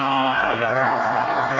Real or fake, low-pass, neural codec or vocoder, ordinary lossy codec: fake; 7.2 kHz; codec, 24 kHz, 1 kbps, SNAC; none